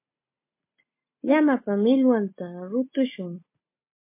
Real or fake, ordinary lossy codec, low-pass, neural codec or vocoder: real; MP3, 24 kbps; 3.6 kHz; none